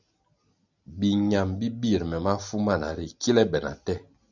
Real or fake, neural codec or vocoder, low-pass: real; none; 7.2 kHz